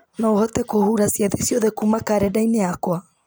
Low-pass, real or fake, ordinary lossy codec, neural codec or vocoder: none; real; none; none